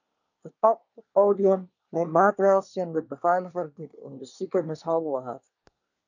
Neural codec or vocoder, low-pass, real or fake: codec, 24 kHz, 1 kbps, SNAC; 7.2 kHz; fake